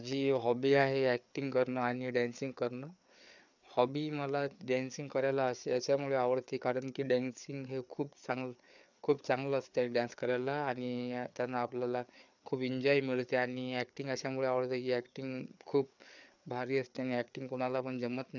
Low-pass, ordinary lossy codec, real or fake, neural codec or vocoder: 7.2 kHz; none; fake; codec, 16 kHz, 4 kbps, FreqCodec, larger model